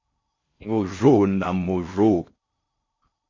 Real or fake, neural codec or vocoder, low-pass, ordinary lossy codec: fake; codec, 16 kHz in and 24 kHz out, 0.6 kbps, FocalCodec, streaming, 4096 codes; 7.2 kHz; MP3, 32 kbps